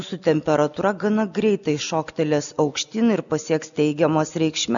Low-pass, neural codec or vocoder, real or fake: 7.2 kHz; none; real